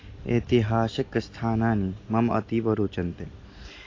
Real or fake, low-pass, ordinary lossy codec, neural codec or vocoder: real; 7.2 kHz; AAC, 32 kbps; none